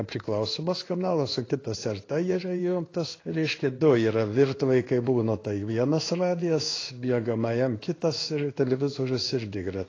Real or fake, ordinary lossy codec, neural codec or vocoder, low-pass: fake; AAC, 32 kbps; codec, 16 kHz in and 24 kHz out, 1 kbps, XY-Tokenizer; 7.2 kHz